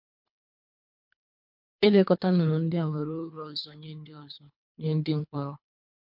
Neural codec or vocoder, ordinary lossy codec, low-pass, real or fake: codec, 24 kHz, 3 kbps, HILCodec; MP3, 48 kbps; 5.4 kHz; fake